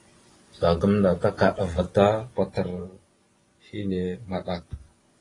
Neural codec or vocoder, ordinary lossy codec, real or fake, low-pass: vocoder, 24 kHz, 100 mel bands, Vocos; AAC, 32 kbps; fake; 10.8 kHz